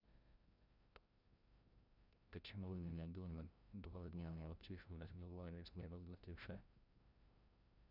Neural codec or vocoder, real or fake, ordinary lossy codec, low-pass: codec, 16 kHz, 0.5 kbps, FreqCodec, larger model; fake; none; 5.4 kHz